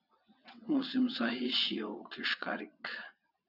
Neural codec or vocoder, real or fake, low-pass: vocoder, 22.05 kHz, 80 mel bands, WaveNeXt; fake; 5.4 kHz